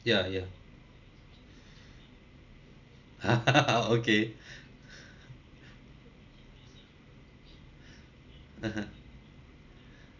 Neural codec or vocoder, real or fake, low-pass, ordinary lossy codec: none; real; 7.2 kHz; none